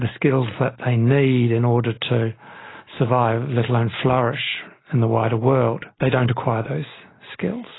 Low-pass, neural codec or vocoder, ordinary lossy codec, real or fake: 7.2 kHz; none; AAC, 16 kbps; real